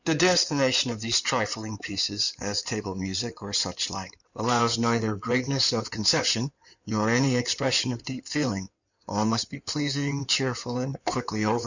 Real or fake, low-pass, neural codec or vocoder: fake; 7.2 kHz; codec, 16 kHz in and 24 kHz out, 2.2 kbps, FireRedTTS-2 codec